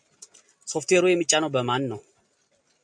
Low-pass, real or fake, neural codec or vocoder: 9.9 kHz; real; none